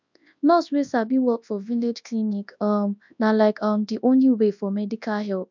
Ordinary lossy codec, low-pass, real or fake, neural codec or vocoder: MP3, 64 kbps; 7.2 kHz; fake; codec, 24 kHz, 0.9 kbps, WavTokenizer, large speech release